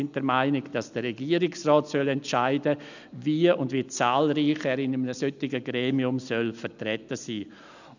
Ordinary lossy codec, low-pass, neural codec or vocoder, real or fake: none; 7.2 kHz; none; real